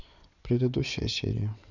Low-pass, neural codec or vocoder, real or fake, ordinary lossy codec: 7.2 kHz; vocoder, 22.05 kHz, 80 mel bands, WaveNeXt; fake; none